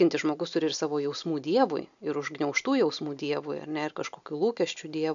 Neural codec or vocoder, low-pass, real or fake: none; 7.2 kHz; real